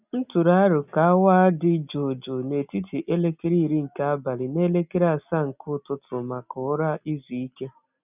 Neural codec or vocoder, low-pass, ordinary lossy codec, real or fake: none; 3.6 kHz; none; real